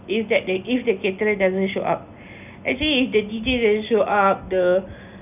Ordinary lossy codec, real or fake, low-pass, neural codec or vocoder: none; real; 3.6 kHz; none